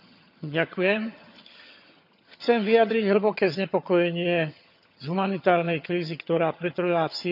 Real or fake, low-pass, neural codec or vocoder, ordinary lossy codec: fake; 5.4 kHz; vocoder, 22.05 kHz, 80 mel bands, HiFi-GAN; none